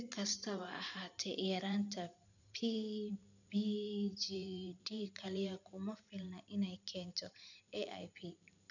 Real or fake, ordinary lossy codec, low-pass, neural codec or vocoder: fake; none; 7.2 kHz; vocoder, 44.1 kHz, 128 mel bands every 512 samples, BigVGAN v2